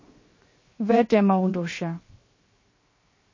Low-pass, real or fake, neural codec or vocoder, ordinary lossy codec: 7.2 kHz; fake; codec, 16 kHz, 0.7 kbps, FocalCodec; MP3, 32 kbps